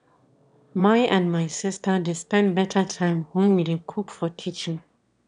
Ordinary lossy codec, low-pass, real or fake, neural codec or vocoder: none; 9.9 kHz; fake; autoencoder, 22.05 kHz, a latent of 192 numbers a frame, VITS, trained on one speaker